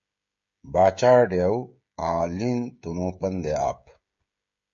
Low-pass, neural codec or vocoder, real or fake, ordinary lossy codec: 7.2 kHz; codec, 16 kHz, 16 kbps, FreqCodec, smaller model; fake; MP3, 48 kbps